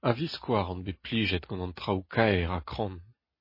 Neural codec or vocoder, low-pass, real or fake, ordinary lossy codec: none; 5.4 kHz; real; MP3, 24 kbps